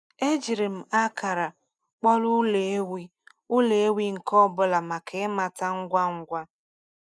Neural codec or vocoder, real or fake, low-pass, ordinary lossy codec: none; real; none; none